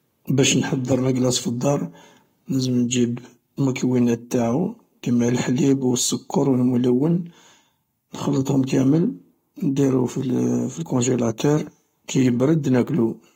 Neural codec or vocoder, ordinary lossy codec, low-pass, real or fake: codec, 44.1 kHz, 7.8 kbps, Pupu-Codec; AAC, 48 kbps; 19.8 kHz; fake